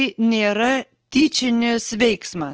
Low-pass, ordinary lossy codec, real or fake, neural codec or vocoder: 7.2 kHz; Opus, 16 kbps; real; none